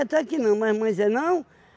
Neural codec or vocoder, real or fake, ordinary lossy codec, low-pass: none; real; none; none